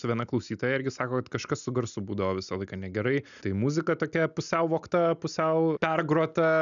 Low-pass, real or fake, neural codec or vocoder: 7.2 kHz; real; none